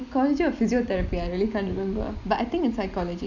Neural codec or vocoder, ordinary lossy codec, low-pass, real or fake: none; none; 7.2 kHz; real